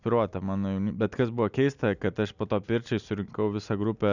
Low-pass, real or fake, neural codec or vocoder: 7.2 kHz; real; none